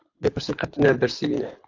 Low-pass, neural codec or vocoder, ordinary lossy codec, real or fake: 7.2 kHz; codec, 24 kHz, 6 kbps, HILCodec; AAC, 48 kbps; fake